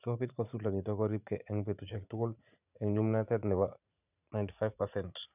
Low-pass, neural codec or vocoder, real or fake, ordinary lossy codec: 3.6 kHz; vocoder, 44.1 kHz, 80 mel bands, Vocos; fake; none